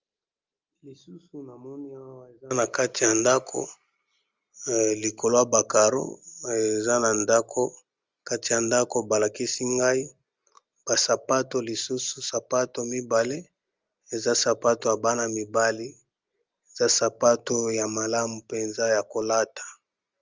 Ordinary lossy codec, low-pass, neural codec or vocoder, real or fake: Opus, 32 kbps; 7.2 kHz; none; real